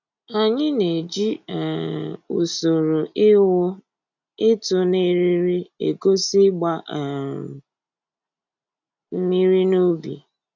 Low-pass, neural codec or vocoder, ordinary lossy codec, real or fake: 7.2 kHz; none; none; real